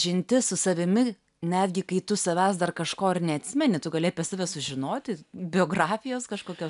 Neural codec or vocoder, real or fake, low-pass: none; real; 10.8 kHz